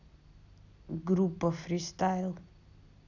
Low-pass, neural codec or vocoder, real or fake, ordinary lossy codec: 7.2 kHz; none; real; none